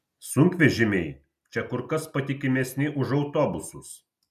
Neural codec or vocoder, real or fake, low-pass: none; real; 14.4 kHz